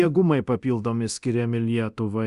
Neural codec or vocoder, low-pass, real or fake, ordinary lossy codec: codec, 24 kHz, 0.9 kbps, DualCodec; 10.8 kHz; fake; Opus, 64 kbps